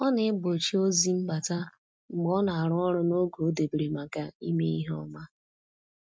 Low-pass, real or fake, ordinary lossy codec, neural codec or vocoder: none; real; none; none